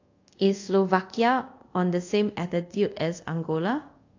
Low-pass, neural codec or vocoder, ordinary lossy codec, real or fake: 7.2 kHz; codec, 24 kHz, 0.5 kbps, DualCodec; AAC, 48 kbps; fake